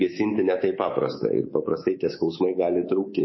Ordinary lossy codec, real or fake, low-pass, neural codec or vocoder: MP3, 24 kbps; fake; 7.2 kHz; codec, 24 kHz, 3.1 kbps, DualCodec